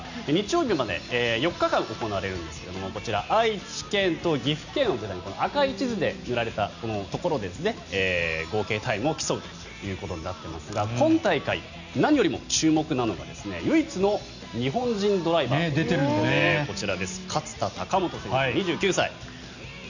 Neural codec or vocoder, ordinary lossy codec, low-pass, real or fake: none; none; 7.2 kHz; real